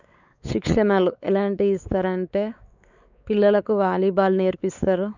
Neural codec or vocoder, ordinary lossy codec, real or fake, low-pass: codec, 16 kHz, 4 kbps, X-Codec, WavLM features, trained on Multilingual LibriSpeech; none; fake; 7.2 kHz